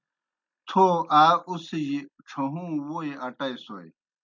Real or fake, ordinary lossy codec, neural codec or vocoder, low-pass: real; MP3, 64 kbps; none; 7.2 kHz